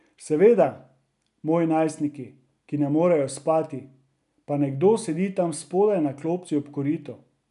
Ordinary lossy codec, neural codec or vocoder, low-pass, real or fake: none; none; 10.8 kHz; real